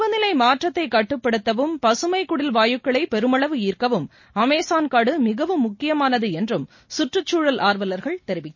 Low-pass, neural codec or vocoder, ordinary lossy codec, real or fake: 7.2 kHz; none; MP3, 32 kbps; real